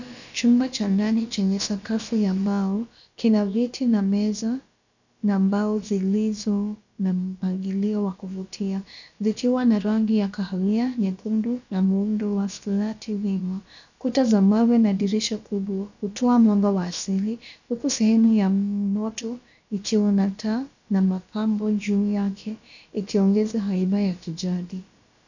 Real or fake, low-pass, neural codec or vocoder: fake; 7.2 kHz; codec, 16 kHz, about 1 kbps, DyCAST, with the encoder's durations